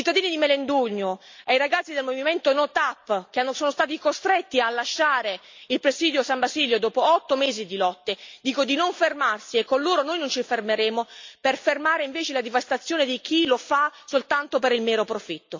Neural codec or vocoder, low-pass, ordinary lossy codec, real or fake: none; 7.2 kHz; MP3, 64 kbps; real